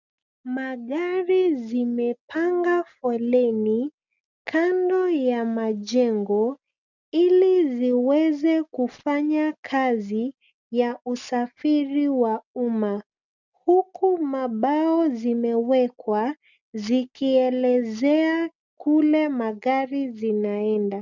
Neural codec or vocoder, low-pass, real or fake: none; 7.2 kHz; real